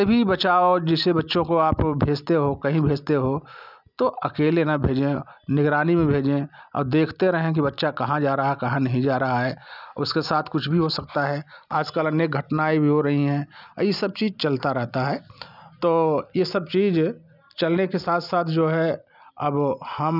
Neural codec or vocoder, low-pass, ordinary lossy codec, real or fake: none; 5.4 kHz; none; real